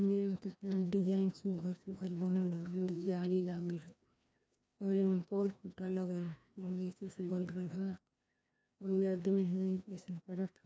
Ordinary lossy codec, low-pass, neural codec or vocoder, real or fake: none; none; codec, 16 kHz, 1 kbps, FreqCodec, larger model; fake